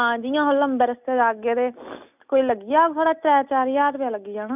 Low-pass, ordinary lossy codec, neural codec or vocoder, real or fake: 3.6 kHz; none; none; real